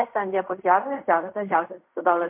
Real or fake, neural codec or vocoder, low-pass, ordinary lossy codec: fake; codec, 16 kHz in and 24 kHz out, 0.4 kbps, LongCat-Audio-Codec, fine tuned four codebook decoder; 3.6 kHz; MP3, 32 kbps